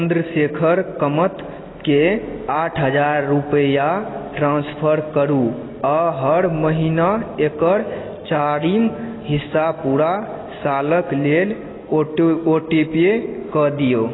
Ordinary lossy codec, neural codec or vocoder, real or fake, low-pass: AAC, 16 kbps; none; real; 7.2 kHz